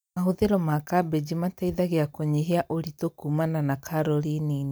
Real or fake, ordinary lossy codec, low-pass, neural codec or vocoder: real; none; none; none